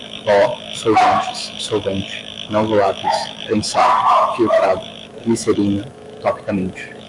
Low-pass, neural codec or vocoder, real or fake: 10.8 kHz; autoencoder, 48 kHz, 128 numbers a frame, DAC-VAE, trained on Japanese speech; fake